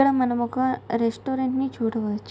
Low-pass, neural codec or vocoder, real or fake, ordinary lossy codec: none; none; real; none